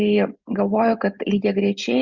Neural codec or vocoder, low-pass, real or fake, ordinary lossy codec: none; 7.2 kHz; real; Opus, 64 kbps